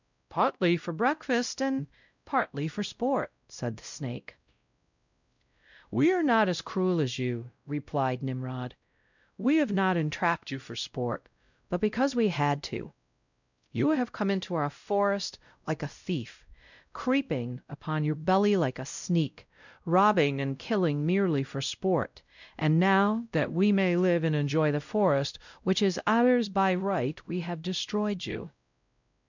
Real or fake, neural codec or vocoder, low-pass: fake; codec, 16 kHz, 0.5 kbps, X-Codec, WavLM features, trained on Multilingual LibriSpeech; 7.2 kHz